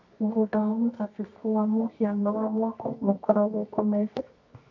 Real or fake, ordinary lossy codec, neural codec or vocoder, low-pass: fake; none; codec, 24 kHz, 0.9 kbps, WavTokenizer, medium music audio release; 7.2 kHz